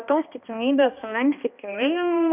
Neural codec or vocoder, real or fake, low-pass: codec, 16 kHz, 1 kbps, X-Codec, HuBERT features, trained on balanced general audio; fake; 3.6 kHz